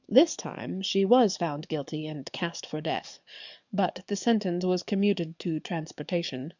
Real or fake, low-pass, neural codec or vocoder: fake; 7.2 kHz; codec, 44.1 kHz, 7.8 kbps, DAC